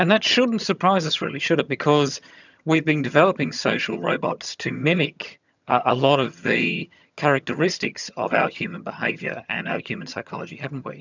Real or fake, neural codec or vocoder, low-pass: fake; vocoder, 22.05 kHz, 80 mel bands, HiFi-GAN; 7.2 kHz